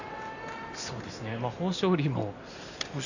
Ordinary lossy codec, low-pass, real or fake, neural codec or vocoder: none; 7.2 kHz; real; none